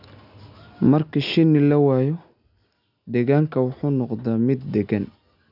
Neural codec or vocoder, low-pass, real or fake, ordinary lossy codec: none; 5.4 kHz; real; none